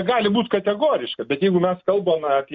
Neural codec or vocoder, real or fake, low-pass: none; real; 7.2 kHz